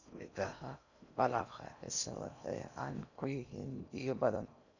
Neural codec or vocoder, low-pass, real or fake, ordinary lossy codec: codec, 16 kHz in and 24 kHz out, 0.6 kbps, FocalCodec, streaming, 4096 codes; 7.2 kHz; fake; none